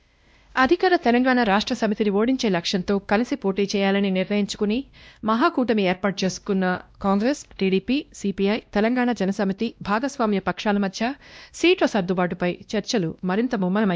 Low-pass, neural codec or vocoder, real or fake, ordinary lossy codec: none; codec, 16 kHz, 1 kbps, X-Codec, WavLM features, trained on Multilingual LibriSpeech; fake; none